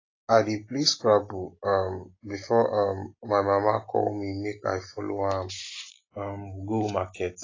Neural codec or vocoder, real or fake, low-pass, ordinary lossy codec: none; real; 7.2 kHz; AAC, 32 kbps